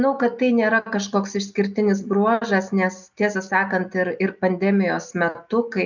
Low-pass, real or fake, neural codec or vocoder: 7.2 kHz; real; none